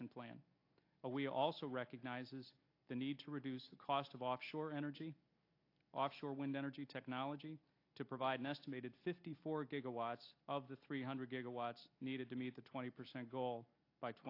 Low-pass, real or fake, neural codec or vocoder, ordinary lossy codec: 5.4 kHz; real; none; AAC, 32 kbps